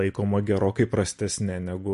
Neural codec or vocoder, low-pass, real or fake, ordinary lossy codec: none; 14.4 kHz; real; MP3, 48 kbps